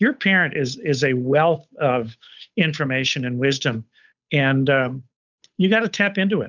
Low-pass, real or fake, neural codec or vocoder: 7.2 kHz; fake; codec, 16 kHz, 8 kbps, FunCodec, trained on Chinese and English, 25 frames a second